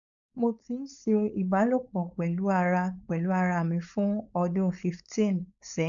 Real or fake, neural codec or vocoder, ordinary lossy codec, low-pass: fake; codec, 16 kHz, 4.8 kbps, FACodec; none; 7.2 kHz